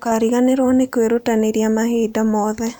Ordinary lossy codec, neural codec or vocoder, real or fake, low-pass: none; vocoder, 44.1 kHz, 128 mel bands every 512 samples, BigVGAN v2; fake; none